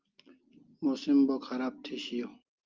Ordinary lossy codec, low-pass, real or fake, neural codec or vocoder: Opus, 32 kbps; 7.2 kHz; real; none